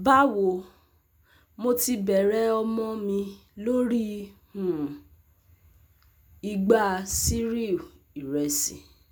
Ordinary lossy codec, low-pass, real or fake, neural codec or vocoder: none; none; real; none